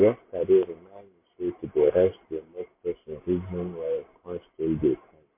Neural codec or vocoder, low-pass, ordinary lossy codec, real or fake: none; 3.6 kHz; MP3, 24 kbps; real